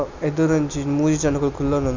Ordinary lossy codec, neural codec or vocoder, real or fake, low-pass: none; none; real; 7.2 kHz